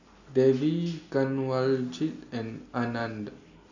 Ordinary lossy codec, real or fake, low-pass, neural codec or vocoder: AAC, 48 kbps; real; 7.2 kHz; none